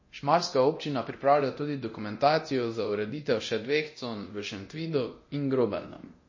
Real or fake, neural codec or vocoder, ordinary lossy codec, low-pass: fake; codec, 24 kHz, 0.9 kbps, DualCodec; MP3, 32 kbps; 7.2 kHz